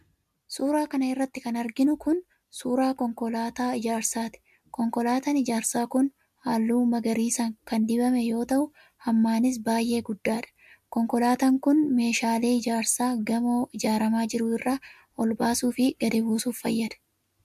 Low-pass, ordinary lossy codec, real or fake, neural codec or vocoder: 14.4 kHz; MP3, 96 kbps; real; none